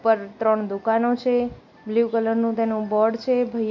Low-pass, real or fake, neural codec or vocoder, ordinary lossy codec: 7.2 kHz; real; none; none